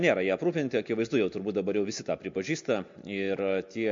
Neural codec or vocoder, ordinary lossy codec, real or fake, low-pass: none; MP3, 48 kbps; real; 7.2 kHz